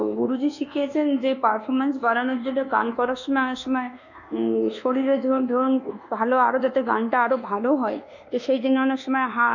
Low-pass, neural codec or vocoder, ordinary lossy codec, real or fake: 7.2 kHz; codec, 24 kHz, 1.2 kbps, DualCodec; none; fake